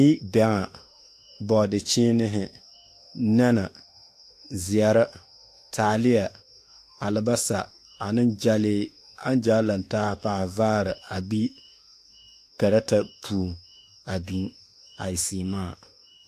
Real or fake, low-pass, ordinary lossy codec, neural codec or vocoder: fake; 14.4 kHz; AAC, 64 kbps; autoencoder, 48 kHz, 32 numbers a frame, DAC-VAE, trained on Japanese speech